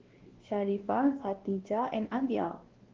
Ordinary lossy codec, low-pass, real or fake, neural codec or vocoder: Opus, 16 kbps; 7.2 kHz; fake; codec, 16 kHz, 1 kbps, X-Codec, WavLM features, trained on Multilingual LibriSpeech